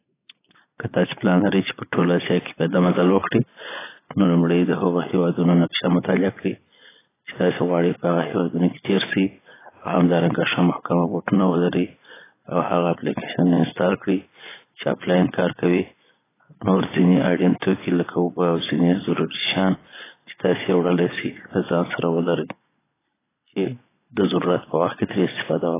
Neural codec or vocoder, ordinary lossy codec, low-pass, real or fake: vocoder, 22.05 kHz, 80 mel bands, Vocos; AAC, 16 kbps; 3.6 kHz; fake